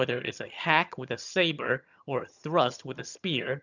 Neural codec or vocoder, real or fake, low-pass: vocoder, 22.05 kHz, 80 mel bands, HiFi-GAN; fake; 7.2 kHz